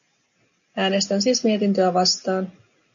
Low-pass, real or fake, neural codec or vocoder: 7.2 kHz; real; none